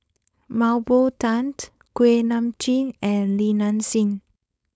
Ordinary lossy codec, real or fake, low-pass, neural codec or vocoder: none; fake; none; codec, 16 kHz, 4.8 kbps, FACodec